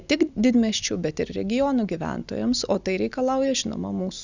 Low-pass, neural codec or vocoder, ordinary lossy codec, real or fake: 7.2 kHz; none; Opus, 64 kbps; real